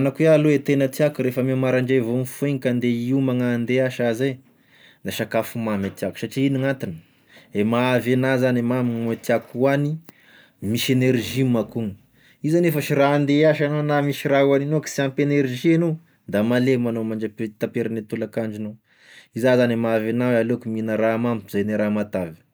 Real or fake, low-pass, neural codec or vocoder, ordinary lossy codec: real; none; none; none